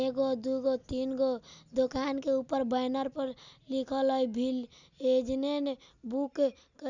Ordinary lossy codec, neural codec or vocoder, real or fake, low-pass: none; none; real; 7.2 kHz